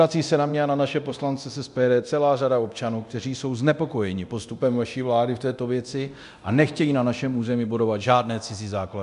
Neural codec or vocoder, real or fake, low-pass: codec, 24 kHz, 0.9 kbps, DualCodec; fake; 10.8 kHz